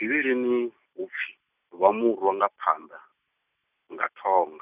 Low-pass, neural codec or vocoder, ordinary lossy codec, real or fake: 3.6 kHz; none; none; real